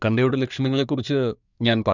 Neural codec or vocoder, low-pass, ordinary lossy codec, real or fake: codec, 24 kHz, 1 kbps, SNAC; 7.2 kHz; none; fake